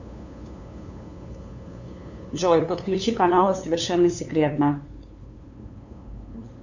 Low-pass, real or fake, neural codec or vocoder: 7.2 kHz; fake; codec, 16 kHz, 2 kbps, FunCodec, trained on LibriTTS, 25 frames a second